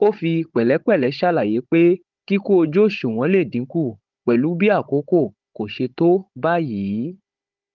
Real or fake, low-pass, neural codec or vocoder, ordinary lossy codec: fake; 7.2 kHz; codec, 16 kHz, 16 kbps, FunCodec, trained on Chinese and English, 50 frames a second; Opus, 32 kbps